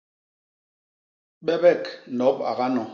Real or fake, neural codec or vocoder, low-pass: real; none; 7.2 kHz